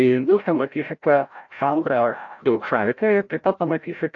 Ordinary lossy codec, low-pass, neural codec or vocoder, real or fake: AAC, 48 kbps; 7.2 kHz; codec, 16 kHz, 0.5 kbps, FreqCodec, larger model; fake